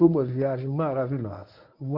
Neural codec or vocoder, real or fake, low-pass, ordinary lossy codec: codec, 24 kHz, 0.9 kbps, WavTokenizer, medium speech release version 1; fake; 5.4 kHz; none